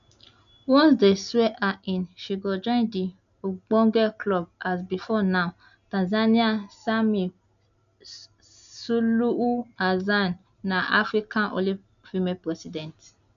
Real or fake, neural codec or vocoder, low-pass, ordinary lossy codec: real; none; 7.2 kHz; none